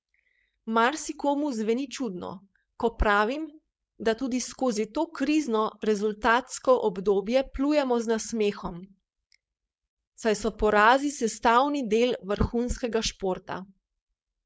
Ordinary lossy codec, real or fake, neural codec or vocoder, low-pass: none; fake; codec, 16 kHz, 4.8 kbps, FACodec; none